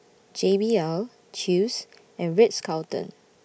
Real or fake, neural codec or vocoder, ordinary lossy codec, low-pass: real; none; none; none